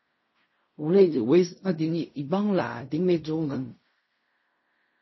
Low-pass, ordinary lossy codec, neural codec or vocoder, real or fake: 7.2 kHz; MP3, 24 kbps; codec, 16 kHz in and 24 kHz out, 0.4 kbps, LongCat-Audio-Codec, fine tuned four codebook decoder; fake